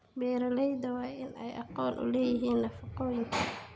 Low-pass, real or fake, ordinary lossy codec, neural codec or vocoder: none; real; none; none